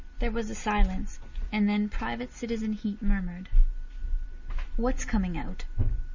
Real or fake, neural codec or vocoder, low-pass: real; none; 7.2 kHz